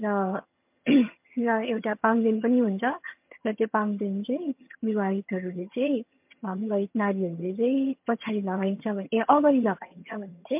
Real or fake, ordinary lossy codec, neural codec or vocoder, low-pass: fake; MP3, 32 kbps; vocoder, 22.05 kHz, 80 mel bands, HiFi-GAN; 3.6 kHz